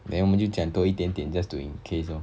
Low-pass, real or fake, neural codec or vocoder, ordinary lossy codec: none; real; none; none